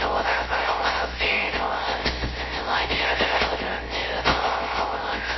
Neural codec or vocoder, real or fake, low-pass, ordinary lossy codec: codec, 16 kHz, 0.3 kbps, FocalCodec; fake; 7.2 kHz; MP3, 24 kbps